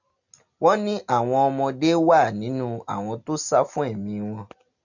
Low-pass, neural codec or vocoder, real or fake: 7.2 kHz; none; real